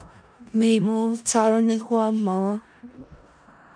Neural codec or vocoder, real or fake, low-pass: codec, 16 kHz in and 24 kHz out, 0.4 kbps, LongCat-Audio-Codec, four codebook decoder; fake; 9.9 kHz